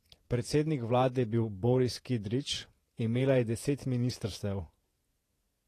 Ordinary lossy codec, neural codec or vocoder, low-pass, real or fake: AAC, 48 kbps; vocoder, 48 kHz, 128 mel bands, Vocos; 14.4 kHz; fake